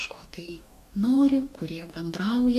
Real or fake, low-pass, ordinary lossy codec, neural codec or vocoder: fake; 14.4 kHz; AAC, 96 kbps; codec, 44.1 kHz, 2.6 kbps, DAC